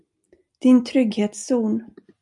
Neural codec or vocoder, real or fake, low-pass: none; real; 10.8 kHz